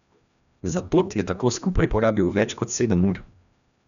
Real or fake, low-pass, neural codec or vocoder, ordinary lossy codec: fake; 7.2 kHz; codec, 16 kHz, 1 kbps, FreqCodec, larger model; none